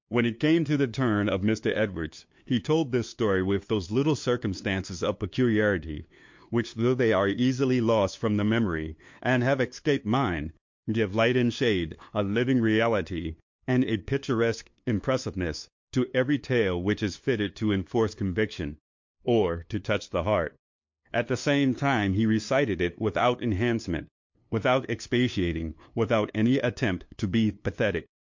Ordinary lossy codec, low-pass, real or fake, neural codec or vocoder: MP3, 48 kbps; 7.2 kHz; fake; codec, 16 kHz, 2 kbps, FunCodec, trained on LibriTTS, 25 frames a second